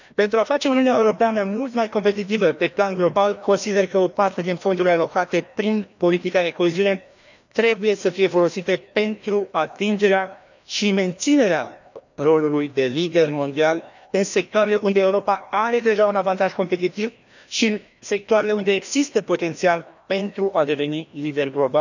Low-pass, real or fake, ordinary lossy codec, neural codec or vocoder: 7.2 kHz; fake; none; codec, 16 kHz, 1 kbps, FreqCodec, larger model